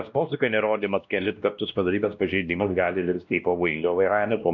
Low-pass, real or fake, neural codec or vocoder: 7.2 kHz; fake; codec, 16 kHz, 1 kbps, X-Codec, WavLM features, trained on Multilingual LibriSpeech